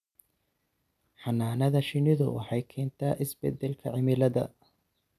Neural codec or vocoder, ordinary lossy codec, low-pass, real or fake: none; none; 14.4 kHz; real